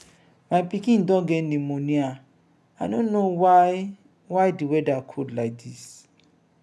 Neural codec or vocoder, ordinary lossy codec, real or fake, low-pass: none; none; real; none